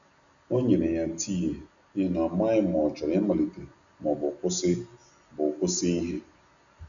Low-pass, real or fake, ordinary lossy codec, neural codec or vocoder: 7.2 kHz; real; none; none